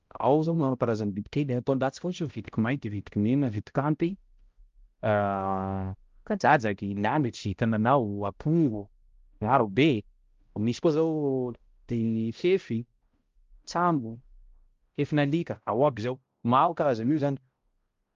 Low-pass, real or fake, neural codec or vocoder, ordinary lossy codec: 7.2 kHz; fake; codec, 16 kHz, 0.5 kbps, X-Codec, HuBERT features, trained on balanced general audio; Opus, 32 kbps